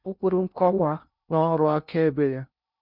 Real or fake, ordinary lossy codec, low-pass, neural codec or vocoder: fake; none; 5.4 kHz; codec, 16 kHz in and 24 kHz out, 0.6 kbps, FocalCodec, streaming, 2048 codes